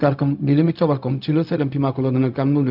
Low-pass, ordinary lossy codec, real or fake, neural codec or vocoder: 5.4 kHz; none; fake; codec, 16 kHz, 0.4 kbps, LongCat-Audio-Codec